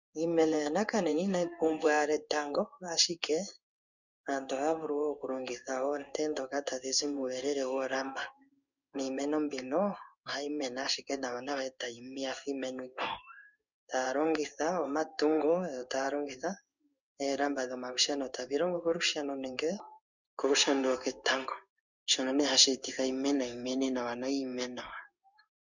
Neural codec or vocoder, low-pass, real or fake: codec, 16 kHz in and 24 kHz out, 1 kbps, XY-Tokenizer; 7.2 kHz; fake